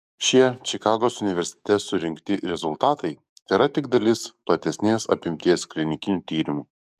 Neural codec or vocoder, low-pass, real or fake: codec, 44.1 kHz, 7.8 kbps, DAC; 14.4 kHz; fake